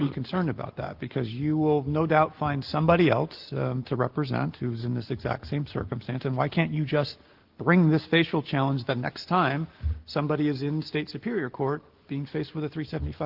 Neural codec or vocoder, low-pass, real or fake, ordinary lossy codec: none; 5.4 kHz; real; Opus, 16 kbps